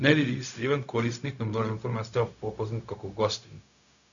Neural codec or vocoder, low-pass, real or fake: codec, 16 kHz, 0.4 kbps, LongCat-Audio-Codec; 7.2 kHz; fake